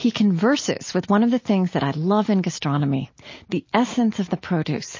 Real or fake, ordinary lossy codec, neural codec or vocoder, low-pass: real; MP3, 32 kbps; none; 7.2 kHz